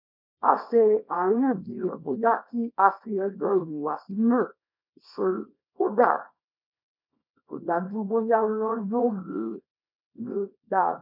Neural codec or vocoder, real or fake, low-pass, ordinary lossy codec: codec, 24 kHz, 0.9 kbps, WavTokenizer, small release; fake; 5.4 kHz; none